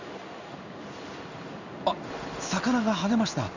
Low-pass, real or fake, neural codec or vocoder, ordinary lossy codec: 7.2 kHz; fake; codec, 16 kHz in and 24 kHz out, 1 kbps, XY-Tokenizer; none